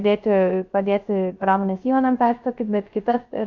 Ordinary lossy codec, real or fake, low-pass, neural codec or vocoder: Opus, 64 kbps; fake; 7.2 kHz; codec, 16 kHz, 0.3 kbps, FocalCodec